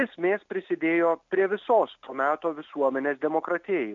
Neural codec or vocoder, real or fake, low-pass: none; real; 7.2 kHz